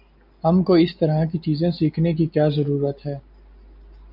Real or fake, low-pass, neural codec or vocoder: real; 5.4 kHz; none